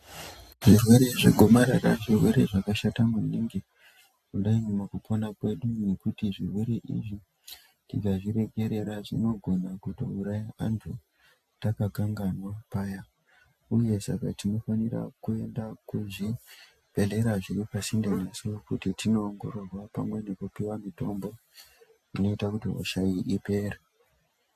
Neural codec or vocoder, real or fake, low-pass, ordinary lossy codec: none; real; 14.4 kHz; AAC, 96 kbps